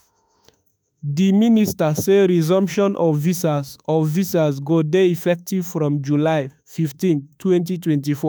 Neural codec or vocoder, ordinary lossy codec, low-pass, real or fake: autoencoder, 48 kHz, 32 numbers a frame, DAC-VAE, trained on Japanese speech; none; none; fake